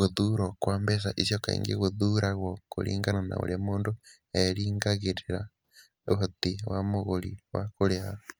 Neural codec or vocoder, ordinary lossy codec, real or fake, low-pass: none; none; real; none